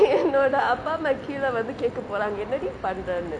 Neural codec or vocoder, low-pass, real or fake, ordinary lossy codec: none; 9.9 kHz; real; none